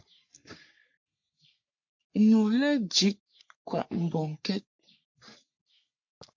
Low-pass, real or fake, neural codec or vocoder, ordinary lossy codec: 7.2 kHz; fake; codec, 44.1 kHz, 3.4 kbps, Pupu-Codec; MP3, 48 kbps